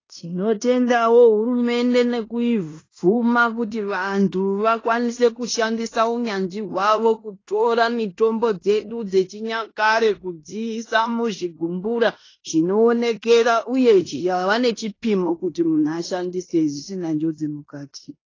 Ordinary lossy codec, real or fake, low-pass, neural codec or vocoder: AAC, 32 kbps; fake; 7.2 kHz; codec, 16 kHz in and 24 kHz out, 0.9 kbps, LongCat-Audio-Codec, fine tuned four codebook decoder